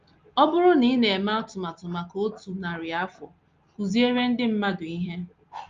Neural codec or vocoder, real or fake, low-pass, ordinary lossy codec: none; real; 7.2 kHz; Opus, 32 kbps